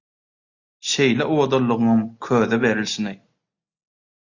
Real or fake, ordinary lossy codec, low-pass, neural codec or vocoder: real; Opus, 64 kbps; 7.2 kHz; none